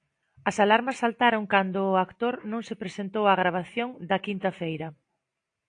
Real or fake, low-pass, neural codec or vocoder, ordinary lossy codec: real; 9.9 kHz; none; AAC, 48 kbps